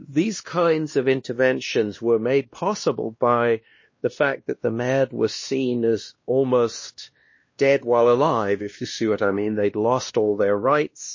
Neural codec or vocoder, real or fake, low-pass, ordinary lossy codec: codec, 16 kHz, 1 kbps, X-Codec, WavLM features, trained on Multilingual LibriSpeech; fake; 7.2 kHz; MP3, 32 kbps